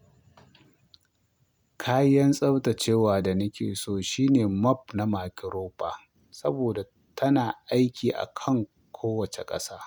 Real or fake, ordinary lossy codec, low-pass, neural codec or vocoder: real; none; none; none